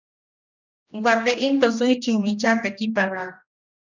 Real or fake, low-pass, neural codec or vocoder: fake; 7.2 kHz; codec, 16 kHz, 1 kbps, X-Codec, HuBERT features, trained on general audio